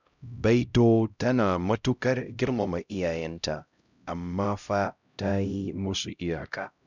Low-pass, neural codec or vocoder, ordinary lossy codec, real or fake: 7.2 kHz; codec, 16 kHz, 0.5 kbps, X-Codec, HuBERT features, trained on LibriSpeech; none; fake